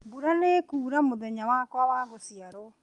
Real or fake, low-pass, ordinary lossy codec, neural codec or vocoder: real; 10.8 kHz; Opus, 32 kbps; none